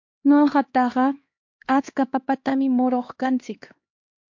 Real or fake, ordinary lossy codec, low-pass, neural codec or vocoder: fake; MP3, 48 kbps; 7.2 kHz; codec, 16 kHz, 2 kbps, X-Codec, HuBERT features, trained on LibriSpeech